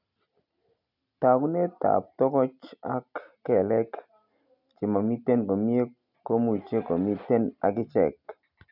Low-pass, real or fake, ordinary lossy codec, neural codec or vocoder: 5.4 kHz; real; none; none